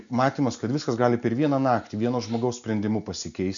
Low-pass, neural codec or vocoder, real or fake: 7.2 kHz; none; real